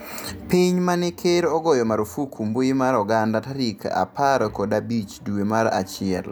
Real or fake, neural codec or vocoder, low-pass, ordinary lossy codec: real; none; none; none